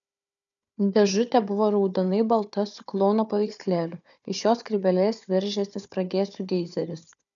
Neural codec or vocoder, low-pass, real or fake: codec, 16 kHz, 4 kbps, FunCodec, trained on Chinese and English, 50 frames a second; 7.2 kHz; fake